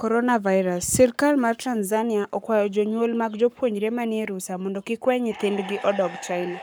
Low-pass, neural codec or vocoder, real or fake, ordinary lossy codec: none; codec, 44.1 kHz, 7.8 kbps, Pupu-Codec; fake; none